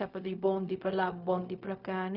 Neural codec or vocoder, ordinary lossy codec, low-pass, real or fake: codec, 16 kHz, 0.4 kbps, LongCat-Audio-Codec; none; 5.4 kHz; fake